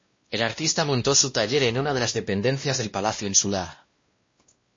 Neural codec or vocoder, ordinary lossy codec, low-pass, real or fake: codec, 16 kHz, 1 kbps, X-Codec, WavLM features, trained on Multilingual LibriSpeech; MP3, 32 kbps; 7.2 kHz; fake